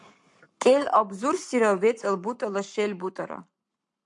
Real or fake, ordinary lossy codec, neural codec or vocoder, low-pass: fake; MP3, 64 kbps; codec, 44.1 kHz, 7.8 kbps, Pupu-Codec; 10.8 kHz